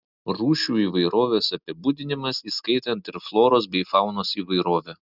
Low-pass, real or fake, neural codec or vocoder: 5.4 kHz; real; none